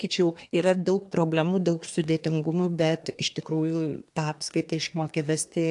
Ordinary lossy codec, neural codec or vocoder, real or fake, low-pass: AAC, 64 kbps; codec, 24 kHz, 1 kbps, SNAC; fake; 10.8 kHz